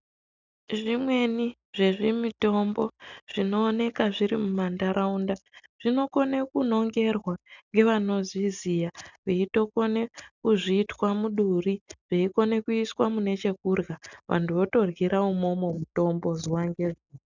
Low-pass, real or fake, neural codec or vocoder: 7.2 kHz; real; none